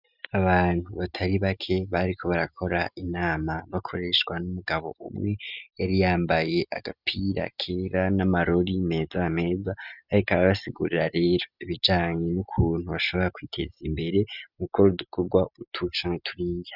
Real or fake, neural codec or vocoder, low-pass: real; none; 5.4 kHz